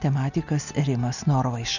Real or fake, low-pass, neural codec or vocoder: real; 7.2 kHz; none